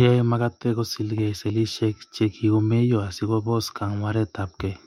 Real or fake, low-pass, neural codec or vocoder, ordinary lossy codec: fake; 19.8 kHz; autoencoder, 48 kHz, 128 numbers a frame, DAC-VAE, trained on Japanese speech; MP3, 64 kbps